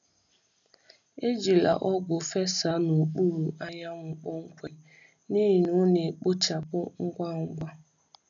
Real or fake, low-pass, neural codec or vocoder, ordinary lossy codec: real; 7.2 kHz; none; none